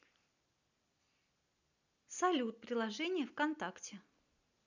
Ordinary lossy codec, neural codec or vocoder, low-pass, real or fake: none; none; 7.2 kHz; real